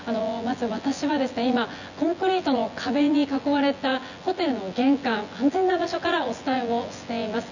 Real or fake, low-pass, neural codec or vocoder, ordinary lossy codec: fake; 7.2 kHz; vocoder, 24 kHz, 100 mel bands, Vocos; none